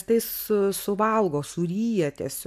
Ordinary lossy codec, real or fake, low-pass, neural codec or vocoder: AAC, 96 kbps; real; 14.4 kHz; none